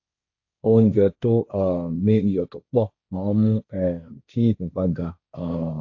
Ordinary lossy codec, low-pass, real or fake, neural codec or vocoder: AAC, 48 kbps; 7.2 kHz; fake; codec, 16 kHz, 1.1 kbps, Voila-Tokenizer